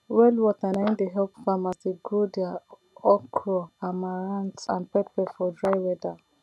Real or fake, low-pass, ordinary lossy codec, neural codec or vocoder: real; none; none; none